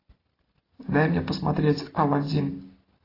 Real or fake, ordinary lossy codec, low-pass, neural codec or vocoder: real; AAC, 24 kbps; 5.4 kHz; none